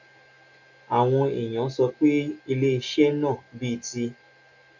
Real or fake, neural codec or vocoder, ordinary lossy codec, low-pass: real; none; none; 7.2 kHz